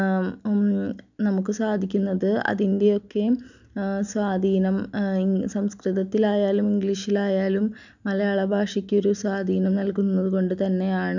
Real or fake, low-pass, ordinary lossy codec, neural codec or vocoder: real; 7.2 kHz; none; none